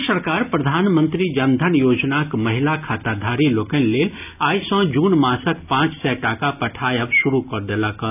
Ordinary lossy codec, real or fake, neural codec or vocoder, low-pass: none; real; none; 3.6 kHz